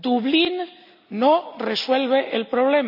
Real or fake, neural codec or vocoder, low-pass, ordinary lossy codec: real; none; 5.4 kHz; none